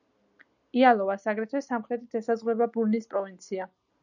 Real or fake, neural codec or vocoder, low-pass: real; none; 7.2 kHz